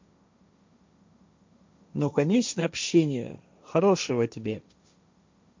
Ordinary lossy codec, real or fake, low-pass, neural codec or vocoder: MP3, 64 kbps; fake; 7.2 kHz; codec, 16 kHz, 1.1 kbps, Voila-Tokenizer